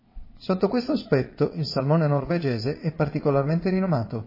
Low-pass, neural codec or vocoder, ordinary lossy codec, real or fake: 5.4 kHz; vocoder, 22.05 kHz, 80 mel bands, Vocos; MP3, 24 kbps; fake